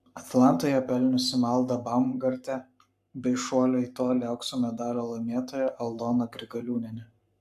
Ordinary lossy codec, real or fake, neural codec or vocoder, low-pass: AAC, 96 kbps; fake; codec, 44.1 kHz, 7.8 kbps, Pupu-Codec; 14.4 kHz